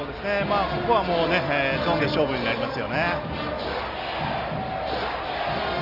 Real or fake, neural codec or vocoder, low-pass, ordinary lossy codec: real; none; 5.4 kHz; Opus, 32 kbps